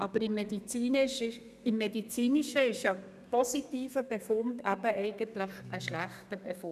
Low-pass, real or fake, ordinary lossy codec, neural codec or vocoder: 14.4 kHz; fake; none; codec, 32 kHz, 1.9 kbps, SNAC